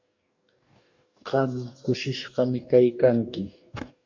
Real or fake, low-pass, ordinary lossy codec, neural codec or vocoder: fake; 7.2 kHz; MP3, 64 kbps; codec, 44.1 kHz, 2.6 kbps, DAC